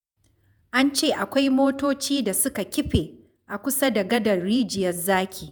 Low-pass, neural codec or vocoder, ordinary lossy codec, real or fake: none; vocoder, 48 kHz, 128 mel bands, Vocos; none; fake